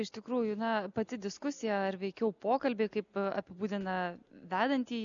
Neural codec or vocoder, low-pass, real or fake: none; 7.2 kHz; real